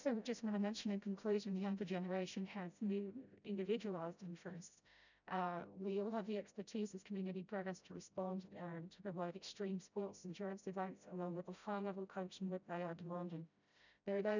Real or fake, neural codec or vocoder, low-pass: fake; codec, 16 kHz, 0.5 kbps, FreqCodec, smaller model; 7.2 kHz